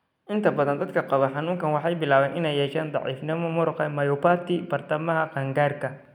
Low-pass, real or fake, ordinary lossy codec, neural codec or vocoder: 19.8 kHz; real; none; none